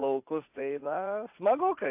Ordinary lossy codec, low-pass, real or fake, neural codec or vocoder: AAC, 32 kbps; 3.6 kHz; fake; vocoder, 44.1 kHz, 80 mel bands, Vocos